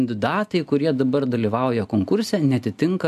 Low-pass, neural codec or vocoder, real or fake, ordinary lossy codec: 14.4 kHz; none; real; AAC, 96 kbps